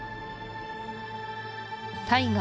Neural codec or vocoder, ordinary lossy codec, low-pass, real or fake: none; none; none; real